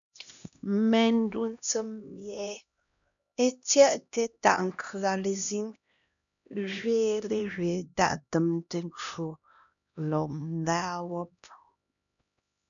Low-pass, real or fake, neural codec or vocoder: 7.2 kHz; fake; codec, 16 kHz, 1 kbps, X-Codec, HuBERT features, trained on LibriSpeech